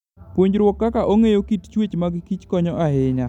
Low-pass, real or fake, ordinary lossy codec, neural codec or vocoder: 19.8 kHz; real; none; none